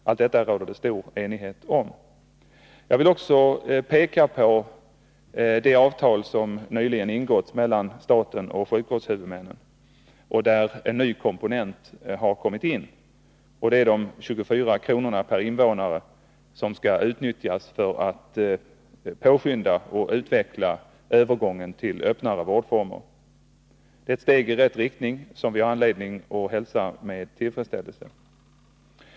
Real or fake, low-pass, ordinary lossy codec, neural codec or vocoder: real; none; none; none